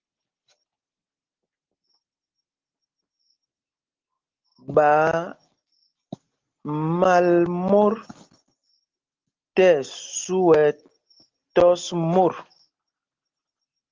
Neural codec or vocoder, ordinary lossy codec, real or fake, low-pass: none; Opus, 16 kbps; real; 7.2 kHz